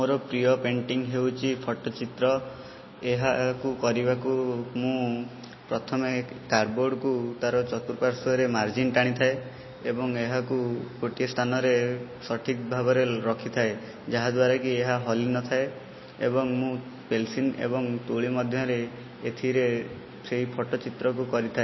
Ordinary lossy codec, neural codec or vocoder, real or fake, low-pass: MP3, 24 kbps; none; real; 7.2 kHz